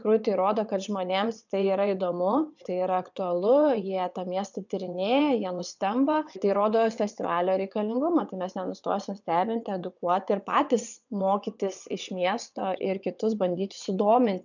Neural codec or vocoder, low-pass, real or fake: vocoder, 22.05 kHz, 80 mel bands, WaveNeXt; 7.2 kHz; fake